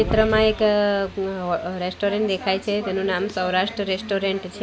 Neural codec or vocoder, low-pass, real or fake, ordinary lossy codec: none; none; real; none